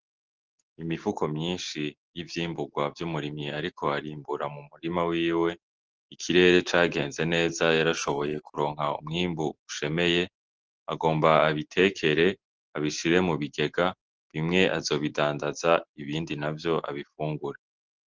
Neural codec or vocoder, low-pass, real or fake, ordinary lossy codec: none; 7.2 kHz; real; Opus, 16 kbps